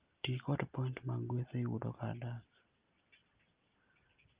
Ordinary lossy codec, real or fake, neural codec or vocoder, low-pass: Opus, 32 kbps; real; none; 3.6 kHz